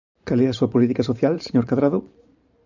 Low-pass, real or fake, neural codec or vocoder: 7.2 kHz; fake; vocoder, 44.1 kHz, 128 mel bands every 512 samples, BigVGAN v2